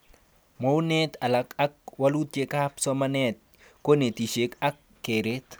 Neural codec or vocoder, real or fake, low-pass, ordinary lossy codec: none; real; none; none